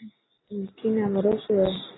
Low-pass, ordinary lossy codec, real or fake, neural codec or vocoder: 7.2 kHz; AAC, 16 kbps; real; none